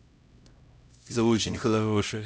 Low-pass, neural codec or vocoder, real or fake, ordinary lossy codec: none; codec, 16 kHz, 0.5 kbps, X-Codec, HuBERT features, trained on LibriSpeech; fake; none